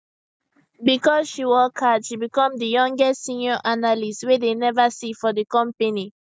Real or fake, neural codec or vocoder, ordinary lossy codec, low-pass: real; none; none; none